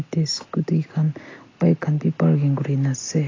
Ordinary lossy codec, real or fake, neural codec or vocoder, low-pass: AAC, 32 kbps; real; none; 7.2 kHz